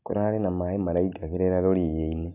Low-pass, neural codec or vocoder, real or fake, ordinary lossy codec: 3.6 kHz; none; real; none